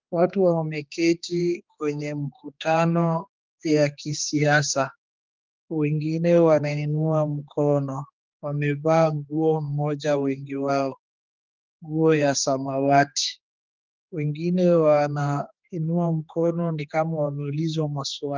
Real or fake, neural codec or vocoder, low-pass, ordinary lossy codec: fake; codec, 16 kHz, 2 kbps, X-Codec, HuBERT features, trained on general audio; 7.2 kHz; Opus, 32 kbps